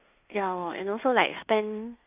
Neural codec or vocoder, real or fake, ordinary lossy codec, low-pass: codec, 16 kHz in and 24 kHz out, 0.9 kbps, LongCat-Audio-Codec, fine tuned four codebook decoder; fake; none; 3.6 kHz